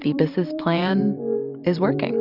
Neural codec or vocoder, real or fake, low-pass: vocoder, 44.1 kHz, 128 mel bands every 512 samples, BigVGAN v2; fake; 5.4 kHz